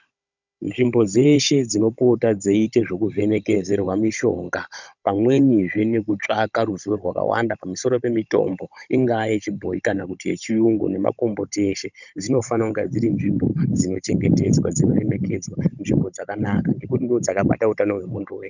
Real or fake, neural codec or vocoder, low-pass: fake; codec, 16 kHz, 16 kbps, FunCodec, trained on Chinese and English, 50 frames a second; 7.2 kHz